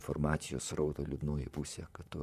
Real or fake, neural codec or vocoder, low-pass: fake; vocoder, 44.1 kHz, 128 mel bands, Pupu-Vocoder; 14.4 kHz